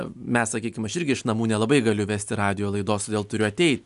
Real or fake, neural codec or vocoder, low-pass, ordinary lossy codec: real; none; 10.8 kHz; MP3, 96 kbps